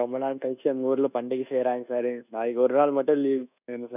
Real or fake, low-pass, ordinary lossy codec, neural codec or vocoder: fake; 3.6 kHz; none; codec, 24 kHz, 1.2 kbps, DualCodec